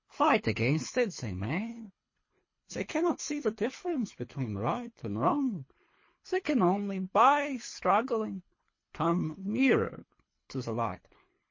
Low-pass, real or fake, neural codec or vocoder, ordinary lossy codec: 7.2 kHz; fake; codec, 24 kHz, 3 kbps, HILCodec; MP3, 32 kbps